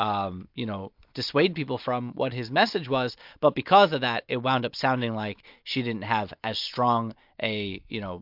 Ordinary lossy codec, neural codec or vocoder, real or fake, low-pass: MP3, 48 kbps; none; real; 5.4 kHz